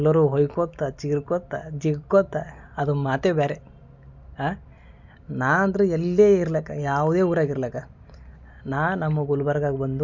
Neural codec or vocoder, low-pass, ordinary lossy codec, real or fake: none; 7.2 kHz; none; real